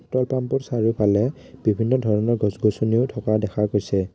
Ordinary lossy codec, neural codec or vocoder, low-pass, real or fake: none; none; none; real